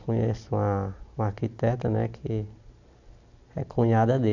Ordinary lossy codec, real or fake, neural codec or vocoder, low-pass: none; real; none; 7.2 kHz